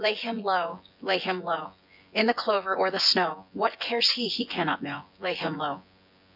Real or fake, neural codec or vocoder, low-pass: fake; vocoder, 24 kHz, 100 mel bands, Vocos; 5.4 kHz